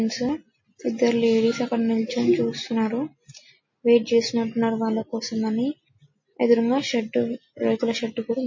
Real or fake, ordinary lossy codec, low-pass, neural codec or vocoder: real; MP3, 32 kbps; 7.2 kHz; none